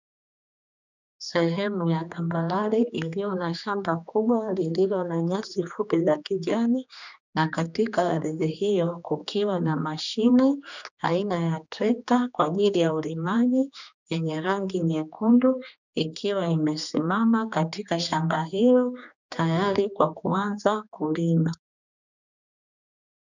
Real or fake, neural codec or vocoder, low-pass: fake; codec, 16 kHz, 2 kbps, X-Codec, HuBERT features, trained on general audio; 7.2 kHz